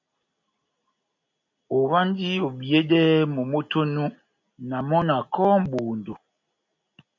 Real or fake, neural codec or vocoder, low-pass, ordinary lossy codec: real; none; 7.2 kHz; MP3, 64 kbps